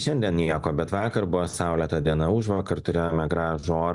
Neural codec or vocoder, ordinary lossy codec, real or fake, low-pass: none; Opus, 64 kbps; real; 10.8 kHz